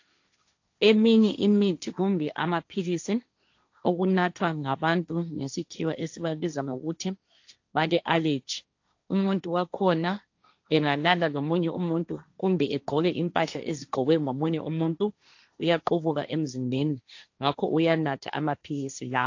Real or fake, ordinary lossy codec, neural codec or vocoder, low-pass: fake; AAC, 48 kbps; codec, 16 kHz, 1.1 kbps, Voila-Tokenizer; 7.2 kHz